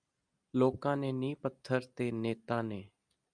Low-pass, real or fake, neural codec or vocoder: 9.9 kHz; real; none